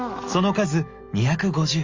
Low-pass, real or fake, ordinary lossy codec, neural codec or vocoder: 7.2 kHz; real; Opus, 32 kbps; none